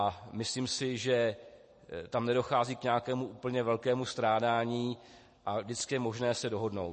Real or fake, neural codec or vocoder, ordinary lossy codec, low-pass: real; none; MP3, 32 kbps; 10.8 kHz